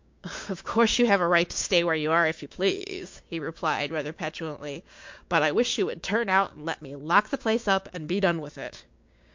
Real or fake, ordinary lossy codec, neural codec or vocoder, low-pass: fake; MP3, 48 kbps; codec, 16 kHz, 6 kbps, DAC; 7.2 kHz